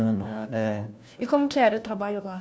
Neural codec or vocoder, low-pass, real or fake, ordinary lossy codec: codec, 16 kHz, 1 kbps, FunCodec, trained on LibriTTS, 50 frames a second; none; fake; none